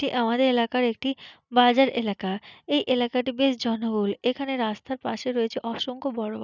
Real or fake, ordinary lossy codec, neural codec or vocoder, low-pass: real; none; none; 7.2 kHz